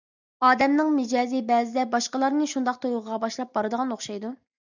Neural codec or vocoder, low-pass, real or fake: none; 7.2 kHz; real